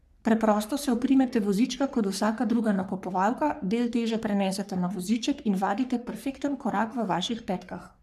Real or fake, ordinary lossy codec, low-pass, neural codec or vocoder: fake; none; 14.4 kHz; codec, 44.1 kHz, 3.4 kbps, Pupu-Codec